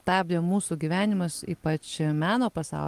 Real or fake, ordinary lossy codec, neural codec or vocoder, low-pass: real; Opus, 24 kbps; none; 14.4 kHz